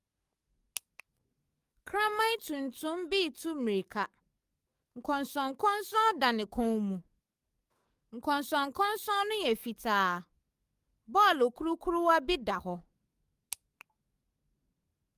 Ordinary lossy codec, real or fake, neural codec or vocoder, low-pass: Opus, 32 kbps; fake; codec, 44.1 kHz, 7.8 kbps, DAC; 14.4 kHz